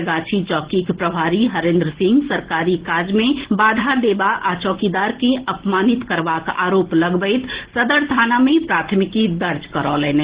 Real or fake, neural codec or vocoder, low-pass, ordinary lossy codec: real; none; 3.6 kHz; Opus, 16 kbps